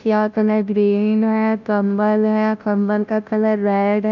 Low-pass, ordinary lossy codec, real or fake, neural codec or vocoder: 7.2 kHz; none; fake; codec, 16 kHz, 0.5 kbps, FunCodec, trained on Chinese and English, 25 frames a second